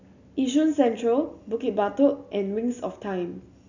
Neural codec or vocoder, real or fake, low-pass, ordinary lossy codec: none; real; 7.2 kHz; none